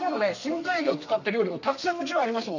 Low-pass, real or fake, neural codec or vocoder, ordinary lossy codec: 7.2 kHz; fake; codec, 32 kHz, 1.9 kbps, SNAC; none